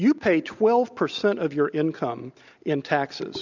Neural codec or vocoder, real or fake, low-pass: none; real; 7.2 kHz